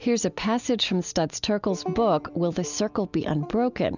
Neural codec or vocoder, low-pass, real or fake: none; 7.2 kHz; real